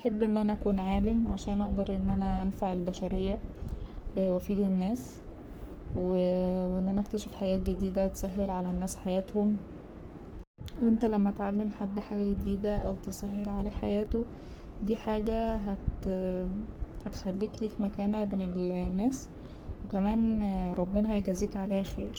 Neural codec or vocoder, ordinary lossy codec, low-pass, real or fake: codec, 44.1 kHz, 3.4 kbps, Pupu-Codec; none; none; fake